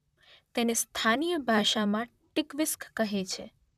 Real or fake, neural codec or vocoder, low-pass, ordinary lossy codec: fake; vocoder, 44.1 kHz, 128 mel bands, Pupu-Vocoder; 14.4 kHz; none